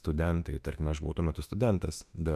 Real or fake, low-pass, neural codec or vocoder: fake; 14.4 kHz; autoencoder, 48 kHz, 32 numbers a frame, DAC-VAE, trained on Japanese speech